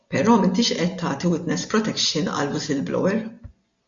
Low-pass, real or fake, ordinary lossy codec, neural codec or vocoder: 7.2 kHz; real; MP3, 48 kbps; none